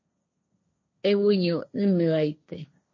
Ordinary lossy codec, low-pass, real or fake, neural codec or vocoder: MP3, 32 kbps; 7.2 kHz; fake; codec, 16 kHz, 1.1 kbps, Voila-Tokenizer